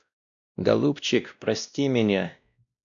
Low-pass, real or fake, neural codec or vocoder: 7.2 kHz; fake; codec, 16 kHz, 1 kbps, X-Codec, WavLM features, trained on Multilingual LibriSpeech